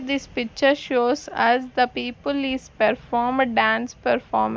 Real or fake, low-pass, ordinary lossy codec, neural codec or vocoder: real; none; none; none